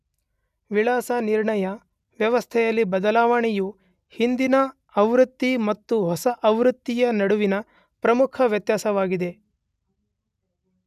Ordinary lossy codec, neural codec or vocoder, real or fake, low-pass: none; none; real; 14.4 kHz